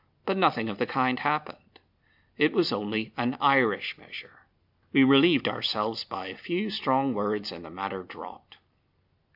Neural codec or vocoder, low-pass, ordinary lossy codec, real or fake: none; 5.4 kHz; AAC, 48 kbps; real